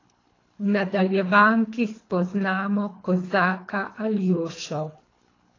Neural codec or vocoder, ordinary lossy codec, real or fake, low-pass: codec, 24 kHz, 3 kbps, HILCodec; AAC, 32 kbps; fake; 7.2 kHz